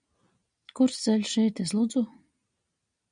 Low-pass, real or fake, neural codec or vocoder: 9.9 kHz; real; none